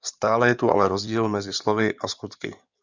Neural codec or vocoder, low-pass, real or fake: codec, 16 kHz in and 24 kHz out, 2.2 kbps, FireRedTTS-2 codec; 7.2 kHz; fake